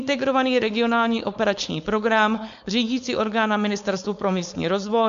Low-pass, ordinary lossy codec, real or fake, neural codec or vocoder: 7.2 kHz; MP3, 64 kbps; fake; codec, 16 kHz, 4.8 kbps, FACodec